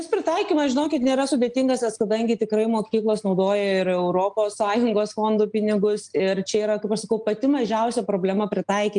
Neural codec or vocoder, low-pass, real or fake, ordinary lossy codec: none; 9.9 kHz; real; Opus, 32 kbps